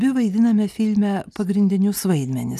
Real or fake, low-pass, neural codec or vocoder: real; 14.4 kHz; none